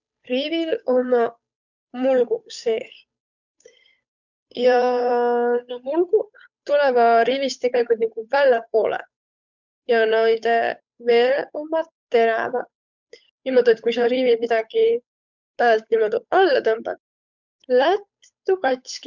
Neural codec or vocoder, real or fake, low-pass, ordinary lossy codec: codec, 16 kHz, 8 kbps, FunCodec, trained on Chinese and English, 25 frames a second; fake; 7.2 kHz; none